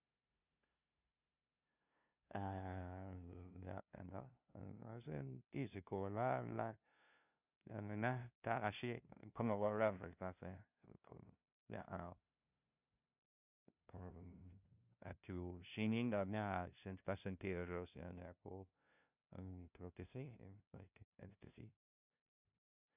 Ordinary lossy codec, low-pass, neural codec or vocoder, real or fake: none; 3.6 kHz; codec, 16 kHz, 0.5 kbps, FunCodec, trained on LibriTTS, 25 frames a second; fake